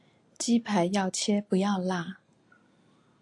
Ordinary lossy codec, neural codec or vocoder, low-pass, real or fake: AAC, 48 kbps; none; 10.8 kHz; real